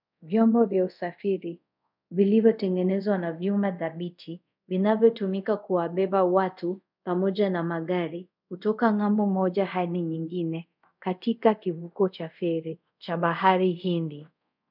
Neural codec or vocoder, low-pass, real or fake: codec, 24 kHz, 0.5 kbps, DualCodec; 5.4 kHz; fake